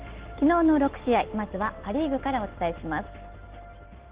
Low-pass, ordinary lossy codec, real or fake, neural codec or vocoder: 3.6 kHz; Opus, 16 kbps; real; none